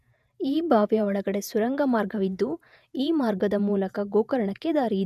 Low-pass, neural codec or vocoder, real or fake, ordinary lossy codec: 14.4 kHz; vocoder, 48 kHz, 128 mel bands, Vocos; fake; none